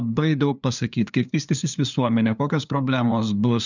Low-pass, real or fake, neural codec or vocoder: 7.2 kHz; fake; codec, 16 kHz, 2 kbps, FunCodec, trained on LibriTTS, 25 frames a second